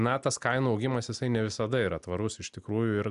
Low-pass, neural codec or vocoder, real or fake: 10.8 kHz; none; real